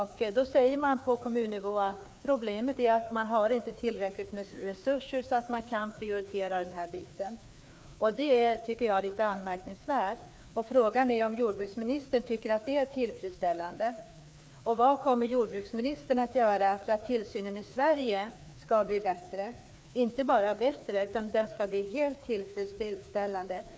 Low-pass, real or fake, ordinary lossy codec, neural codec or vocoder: none; fake; none; codec, 16 kHz, 2 kbps, FreqCodec, larger model